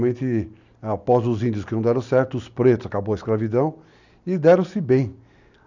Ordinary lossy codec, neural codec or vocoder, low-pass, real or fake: none; none; 7.2 kHz; real